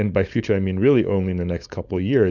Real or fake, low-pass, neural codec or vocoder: fake; 7.2 kHz; codec, 16 kHz, 4.8 kbps, FACodec